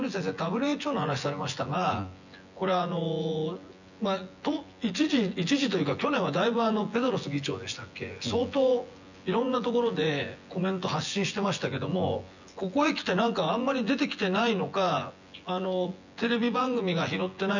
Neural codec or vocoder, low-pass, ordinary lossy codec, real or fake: vocoder, 24 kHz, 100 mel bands, Vocos; 7.2 kHz; none; fake